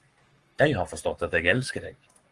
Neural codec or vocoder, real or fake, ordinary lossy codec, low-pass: codec, 44.1 kHz, 7.8 kbps, Pupu-Codec; fake; Opus, 24 kbps; 10.8 kHz